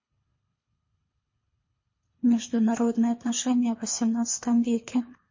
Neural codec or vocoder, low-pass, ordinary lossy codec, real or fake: codec, 24 kHz, 3 kbps, HILCodec; 7.2 kHz; MP3, 32 kbps; fake